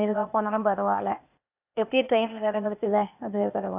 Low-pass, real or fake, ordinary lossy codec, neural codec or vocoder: 3.6 kHz; fake; none; codec, 16 kHz, 0.8 kbps, ZipCodec